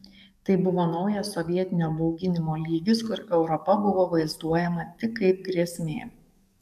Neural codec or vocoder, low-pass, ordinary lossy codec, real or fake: codec, 44.1 kHz, 7.8 kbps, DAC; 14.4 kHz; AAC, 96 kbps; fake